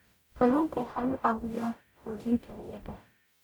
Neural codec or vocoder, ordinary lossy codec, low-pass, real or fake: codec, 44.1 kHz, 0.9 kbps, DAC; none; none; fake